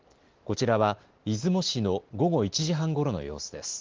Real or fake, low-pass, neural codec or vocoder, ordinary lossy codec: real; 7.2 kHz; none; Opus, 16 kbps